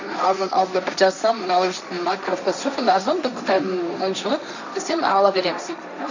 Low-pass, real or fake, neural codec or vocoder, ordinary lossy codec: 7.2 kHz; fake; codec, 16 kHz, 1.1 kbps, Voila-Tokenizer; none